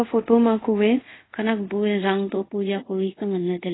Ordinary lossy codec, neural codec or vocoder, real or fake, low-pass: AAC, 16 kbps; codec, 24 kHz, 0.5 kbps, DualCodec; fake; 7.2 kHz